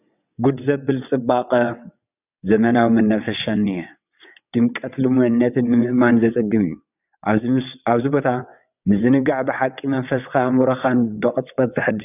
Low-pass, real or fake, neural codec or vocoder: 3.6 kHz; fake; vocoder, 22.05 kHz, 80 mel bands, WaveNeXt